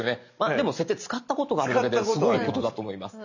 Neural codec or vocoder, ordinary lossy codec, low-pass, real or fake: none; none; 7.2 kHz; real